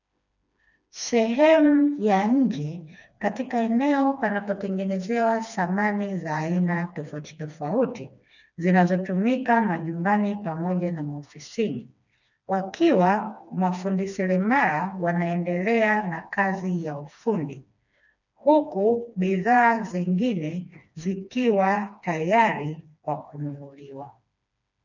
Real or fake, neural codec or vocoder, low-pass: fake; codec, 16 kHz, 2 kbps, FreqCodec, smaller model; 7.2 kHz